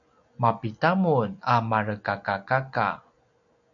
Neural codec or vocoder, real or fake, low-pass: none; real; 7.2 kHz